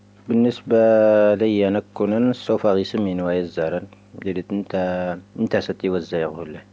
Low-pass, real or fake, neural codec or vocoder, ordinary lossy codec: none; real; none; none